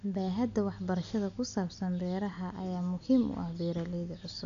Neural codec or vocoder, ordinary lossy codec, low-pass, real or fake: none; none; 7.2 kHz; real